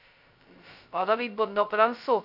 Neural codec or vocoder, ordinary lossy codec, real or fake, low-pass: codec, 16 kHz, 0.2 kbps, FocalCodec; none; fake; 5.4 kHz